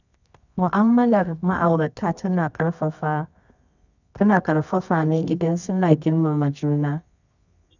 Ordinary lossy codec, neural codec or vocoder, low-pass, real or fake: none; codec, 24 kHz, 0.9 kbps, WavTokenizer, medium music audio release; 7.2 kHz; fake